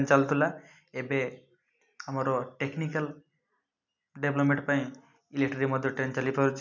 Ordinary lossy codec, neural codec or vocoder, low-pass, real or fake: none; none; 7.2 kHz; real